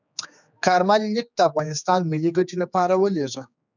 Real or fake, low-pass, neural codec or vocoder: fake; 7.2 kHz; codec, 16 kHz, 4 kbps, X-Codec, HuBERT features, trained on general audio